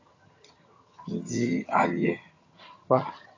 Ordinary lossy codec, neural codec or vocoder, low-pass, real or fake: AAC, 32 kbps; vocoder, 22.05 kHz, 80 mel bands, HiFi-GAN; 7.2 kHz; fake